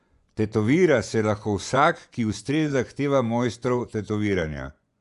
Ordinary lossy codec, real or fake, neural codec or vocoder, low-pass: none; fake; vocoder, 24 kHz, 100 mel bands, Vocos; 10.8 kHz